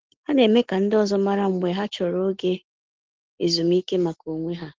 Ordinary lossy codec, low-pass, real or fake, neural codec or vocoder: Opus, 16 kbps; 7.2 kHz; real; none